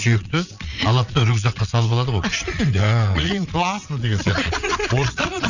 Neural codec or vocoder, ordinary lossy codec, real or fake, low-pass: vocoder, 22.05 kHz, 80 mel bands, Vocos; none; fake; 7.2 kHz